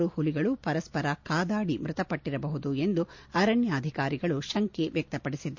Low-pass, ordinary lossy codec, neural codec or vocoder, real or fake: 7.2 kHz; MP3, 48 kbps; none; real